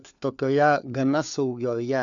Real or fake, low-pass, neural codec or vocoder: fake; 7.2 kHz; codec, 16 kHz, 4 kbps, FunCodec, trained on LibriTTS, 50 frames a second